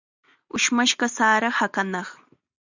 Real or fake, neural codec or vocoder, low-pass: real; none; 7.2 kHz